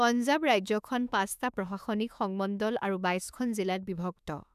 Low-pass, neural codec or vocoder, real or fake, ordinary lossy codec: 14.4 kHz; autoencoder, 48 kHz, 32 numbers a frame, DAC-VAE, trained on Japanese speech; fake; none